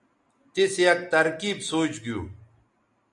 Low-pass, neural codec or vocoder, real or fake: 10.8 kHz; none; real